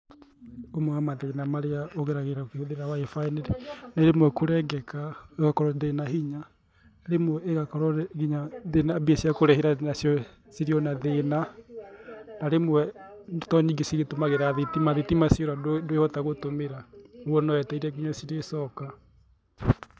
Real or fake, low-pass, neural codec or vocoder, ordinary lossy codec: real; none; none; none